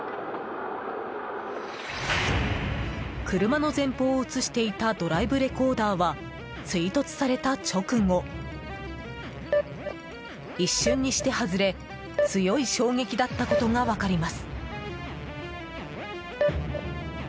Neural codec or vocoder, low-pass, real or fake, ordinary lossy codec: none; none; real; none